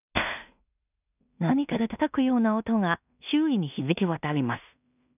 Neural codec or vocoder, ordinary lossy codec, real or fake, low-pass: codec, 16 kHz in and 24 kHz out, 0.4 kbps, LongCat-Audio-Codec, two codebook decoder; none; fake; 3.6 kHz